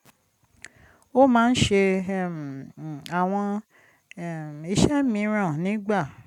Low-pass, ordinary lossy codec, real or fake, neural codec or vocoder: 19.8 kHz; none; real; none